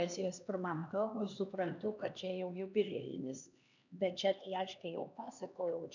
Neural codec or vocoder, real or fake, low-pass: codec, 16 kHz, 2 kbps, X-Codec, HuBERT features, trained on LibriSpeech; fake; 7.2 kHz